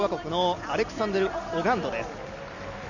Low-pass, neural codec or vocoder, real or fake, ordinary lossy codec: 7.2 kHz; none; real; none